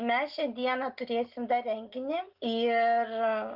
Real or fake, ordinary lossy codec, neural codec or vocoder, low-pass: real; Opus, 32 kbps; none; 5.4 kHz